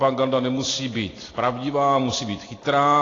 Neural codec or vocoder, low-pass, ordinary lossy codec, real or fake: none; 7.2 kHz; AAC, 32 kbps; real